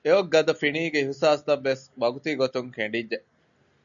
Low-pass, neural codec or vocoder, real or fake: 7.2 kHz; none; real